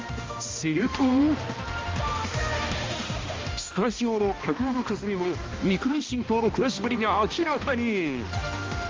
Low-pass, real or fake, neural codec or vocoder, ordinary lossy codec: 7.2 kHz; fake; codec, 16 kHz, 1 kbps, X-Codec, HuBERT features, trained on balanced general audio; Opus, 32 kbps